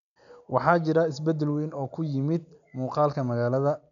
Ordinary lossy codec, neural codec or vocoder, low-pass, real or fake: none; none; 7.2 kHz; real